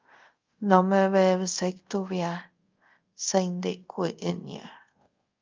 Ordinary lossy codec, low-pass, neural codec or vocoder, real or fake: Opus, 24 kbps; 7.2 kHz; codec, 24 kHz, 0.5 kbps, DualCodec; fake